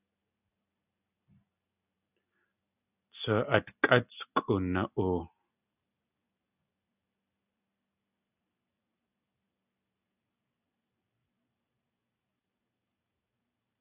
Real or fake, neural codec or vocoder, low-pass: real; none; 3.6 kHz